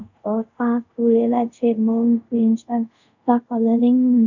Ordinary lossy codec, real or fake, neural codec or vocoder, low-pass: none; fake; codec, 24 kHz, 0.5 kbps, DualCodec; 7.2 kHz